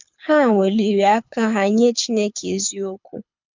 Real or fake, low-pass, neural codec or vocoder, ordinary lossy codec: fake; 7.2 kHz; codec, 24 kHz, 6 kbps, HILCodec; MP3, 64 kbps